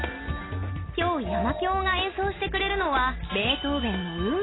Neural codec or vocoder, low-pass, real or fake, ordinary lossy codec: none; 7.2 kHz; real; AAC, 16 kbps